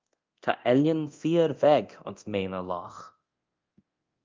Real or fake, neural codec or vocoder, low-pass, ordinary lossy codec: fake; codec, 24 kHz, 0.9 kbps, DualCodec; 7.2 kHz; Opus, 24 kbps